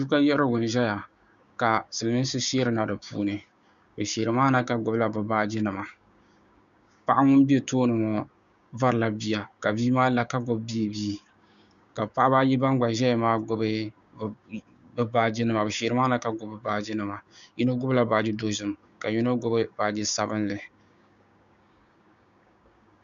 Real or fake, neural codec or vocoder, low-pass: fake; codec, 16 kHz, 6 kbps, DAC; 7.2 kHz